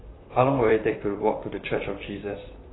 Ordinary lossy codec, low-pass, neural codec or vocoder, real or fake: AAC, 16 kbps; 7.2 kHz; vocoder, 44.1 kHz, 128 mel bands, Pupu-Vocoder; fake